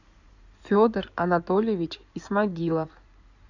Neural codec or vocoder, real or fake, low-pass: codec, 16 kHz in and 24 kHz out, 2.2 kbps, FireRedTTS-2 codec; fake; 7.2 kHz